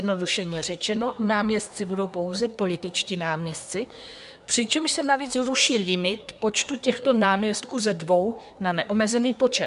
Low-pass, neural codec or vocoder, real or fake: 10.8 kHz; codec, 24 kHz, 1 kbps, SNAC; fake